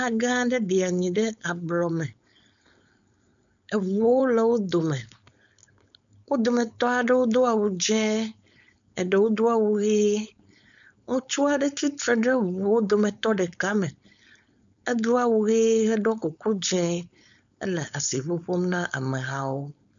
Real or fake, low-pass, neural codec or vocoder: fake; 7.2 kHz; codec, 16 kHz, 4.8 kbps, FACodec